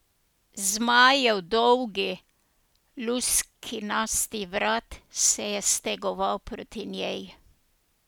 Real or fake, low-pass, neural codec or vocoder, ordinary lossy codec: real; none; none; none